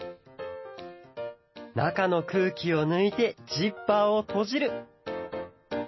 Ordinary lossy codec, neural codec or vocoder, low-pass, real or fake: MP3, 24 kbps; none; 7.2 kHz; real